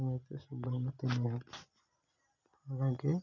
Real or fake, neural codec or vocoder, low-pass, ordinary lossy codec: real; none; 7.2 kHz; none